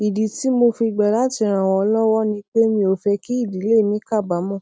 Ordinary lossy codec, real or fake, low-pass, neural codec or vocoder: none; real; none; none